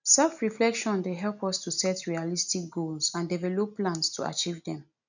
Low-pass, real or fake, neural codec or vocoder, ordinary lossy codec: 7.2 kHz; real; none; none